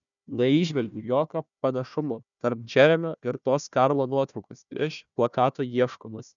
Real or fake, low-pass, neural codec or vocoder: fake; 7.2 kHz; codec, 16 kHz, 1 kbps, FunCodec, trained on Chinese and English, 50 frames a second